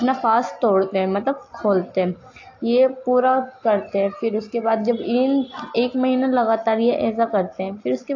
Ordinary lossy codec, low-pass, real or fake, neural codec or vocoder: AAC, 48 kbps; 7.2 kHz; real; none